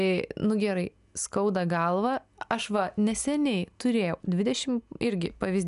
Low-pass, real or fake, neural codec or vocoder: 10.8 kHz; real; none